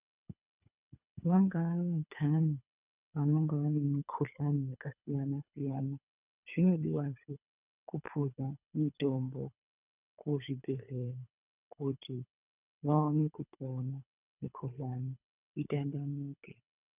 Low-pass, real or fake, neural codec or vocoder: 3.6 kHz; fake; codec, 24 kHz, 3 kbps, HILCodec